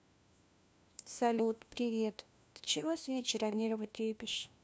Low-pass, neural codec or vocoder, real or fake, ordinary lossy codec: none; codec, 16 kHz, 1 kbps, FunCodec, trained on LibriTTS, 50 frames a second; fake; none